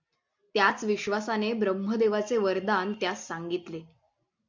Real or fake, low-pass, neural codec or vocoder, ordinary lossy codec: real; 7.2 kHz; none; MP3, 64 kbps